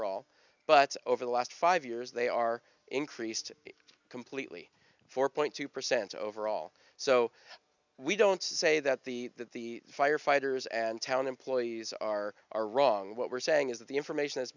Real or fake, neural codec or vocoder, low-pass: real; none; 7.2 kHz